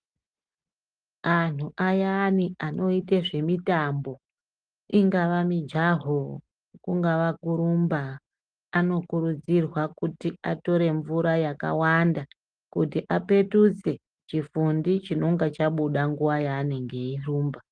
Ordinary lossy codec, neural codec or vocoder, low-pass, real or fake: Opus, 24 kbps; none; 9.9 kHz; real